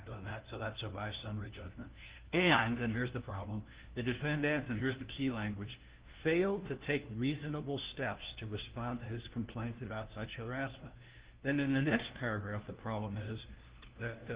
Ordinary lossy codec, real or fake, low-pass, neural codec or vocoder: Opus, 16 kbps; fake; 3.6 kHz; codec, 16 kHz, 1 kbps, FunCodec, trained on LibriTTS, 50 frames a second